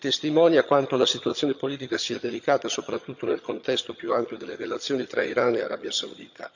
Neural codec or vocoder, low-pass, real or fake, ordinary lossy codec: vocoder, 22.05 kHz, 80 mel bands, HiFi-GAN; 7.2 kHz; fake; none